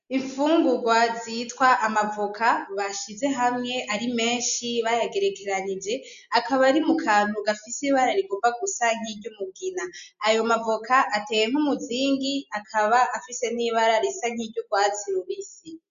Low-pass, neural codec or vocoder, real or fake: 7.2 kHz; none; real